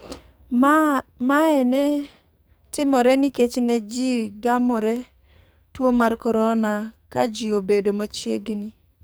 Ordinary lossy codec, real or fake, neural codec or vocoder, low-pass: none; fake; codec, 44.1 kHz, 2.6 kbps, SNAC; none